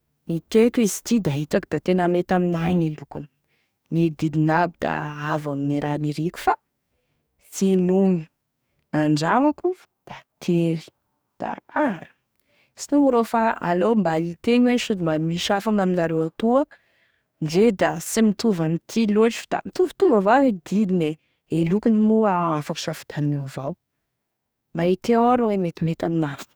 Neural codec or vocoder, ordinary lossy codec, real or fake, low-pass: codec, 44.1 kHz, 2.6 kbps, DAC; none; fake; none